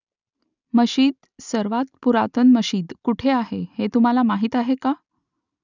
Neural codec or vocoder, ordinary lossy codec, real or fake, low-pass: none; none; real; 7.2 kHz